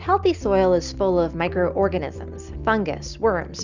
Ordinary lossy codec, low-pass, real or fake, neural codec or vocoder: Opus, 64 kbps; 7.2 kHz; real; none